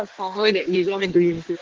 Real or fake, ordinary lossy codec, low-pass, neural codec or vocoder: fake; Opus, 16 kbps; 7.2 kHz; codec, 16 kHz in and 24 kHz out, 1.1 kbps, FireRedTTS-2 codec